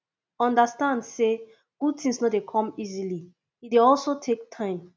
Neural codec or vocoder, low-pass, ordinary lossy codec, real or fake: none; none; none; real